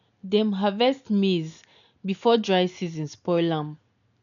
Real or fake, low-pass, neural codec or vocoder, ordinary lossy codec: real; 7.2 kHz; none; none